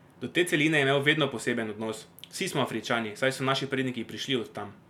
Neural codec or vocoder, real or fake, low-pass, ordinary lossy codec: none; real; 19.8 kHz; none